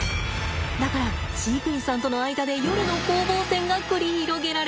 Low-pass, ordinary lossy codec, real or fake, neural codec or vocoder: none; none; real; none